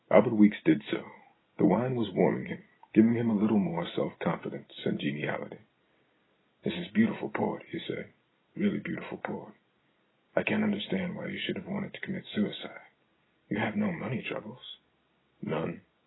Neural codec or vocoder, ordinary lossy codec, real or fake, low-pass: none; AAC, 16 kbps; real; 7.2 kHz